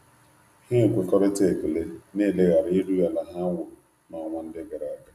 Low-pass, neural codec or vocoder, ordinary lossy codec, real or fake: 14.4 kHz; none; none; real